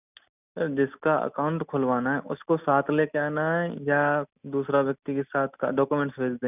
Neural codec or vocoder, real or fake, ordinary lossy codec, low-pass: none; real; none; 3.6 kHz